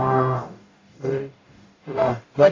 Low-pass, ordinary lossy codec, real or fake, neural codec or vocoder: 7.2 kHz; AAC, 32 kbps; fake; codec, 44.1 kHz, 0.9 kbps, DAC